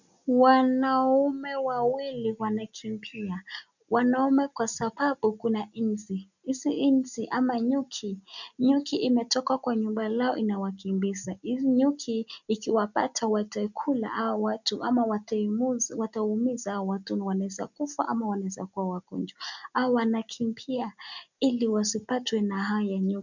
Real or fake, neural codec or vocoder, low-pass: real; none; 7.2 kHz